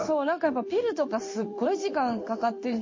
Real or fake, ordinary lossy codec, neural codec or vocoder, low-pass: fake; MP3, 32 kbps; codec, 16 kHz in and 24 kHz out, 1 kbps, XY-Tokenizer; 7.2 kHz